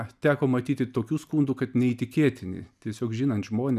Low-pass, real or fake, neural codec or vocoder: 14.4 kHz; real; none